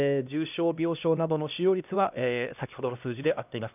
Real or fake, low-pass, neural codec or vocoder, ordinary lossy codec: fake; 3.6 kHz; codec, 16 kHz, 1 kbps, X-Codec, HuBERT features, trained on LibriSpeech; none